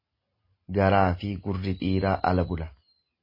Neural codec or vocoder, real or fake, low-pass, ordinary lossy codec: none; real; 5.4 kHz; MP3, 24 kbps